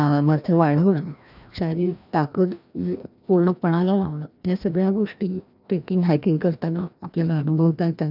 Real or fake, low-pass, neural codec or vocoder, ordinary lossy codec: fake; 5.4 kHz; codec, 16 kHz, 1 kbps, FreqCodec, larger model; none